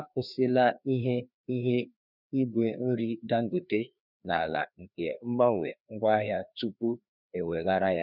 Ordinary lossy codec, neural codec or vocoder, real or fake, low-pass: none; codec, 16 kHz, 2 kbps, FreqCodec, larger model; fake; 5.4 kHz